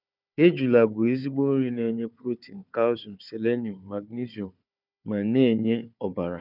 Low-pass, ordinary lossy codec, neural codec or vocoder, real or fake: 5.4 kHz; none; codec, 16 kHz, 4 kbps, FunCodec, trained on Chinese and English, 50 frames a second; fake